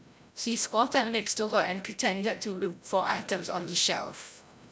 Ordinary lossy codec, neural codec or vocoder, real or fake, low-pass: none; codec, 16 kHz, 0.5 kbps, FreqCodec, larger model; fake; none